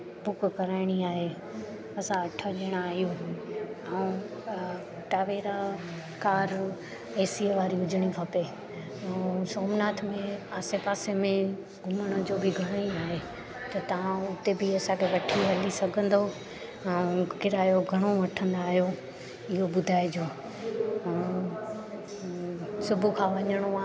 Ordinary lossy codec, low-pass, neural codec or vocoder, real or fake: none; none; none; real